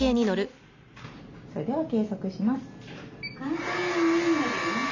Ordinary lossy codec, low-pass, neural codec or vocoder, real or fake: none; 7.2 kHz; none; real